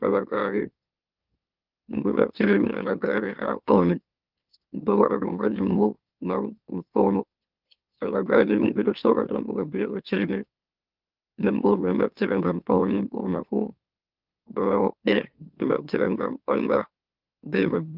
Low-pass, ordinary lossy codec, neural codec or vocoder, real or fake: 5.4 kHz; Opus, 24 kbps; autoencoder, 44.1 kHz, a latent of 192 numbers a frame, MeloTTS; fake